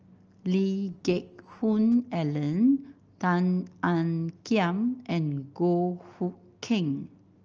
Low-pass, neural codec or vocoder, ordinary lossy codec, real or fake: 7.2 kHz; none; Opus, 24 kbps; real